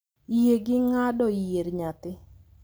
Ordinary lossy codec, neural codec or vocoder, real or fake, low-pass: none; none; real; none